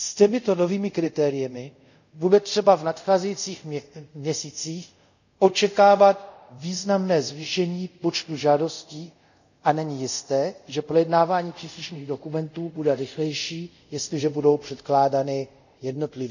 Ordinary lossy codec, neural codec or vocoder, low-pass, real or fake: none; codec, 24 kHz, 0.5 kbps, DualCodec; 7.2 kHz; fake